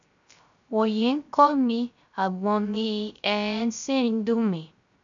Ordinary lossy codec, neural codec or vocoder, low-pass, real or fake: none; codec, 16 kHz, 0.3 kbps, FocalCodec; 7.2 kHz; fake